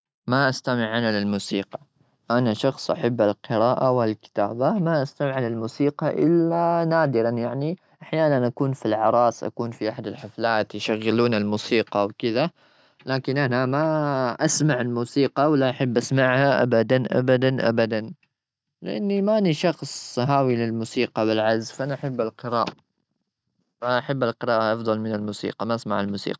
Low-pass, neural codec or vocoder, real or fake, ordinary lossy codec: none; none; real; none